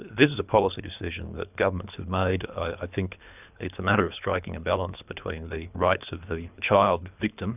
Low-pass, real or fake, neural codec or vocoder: 3.6 kHz; fake; codec, 24 kHz, 3 kbps, HILCodec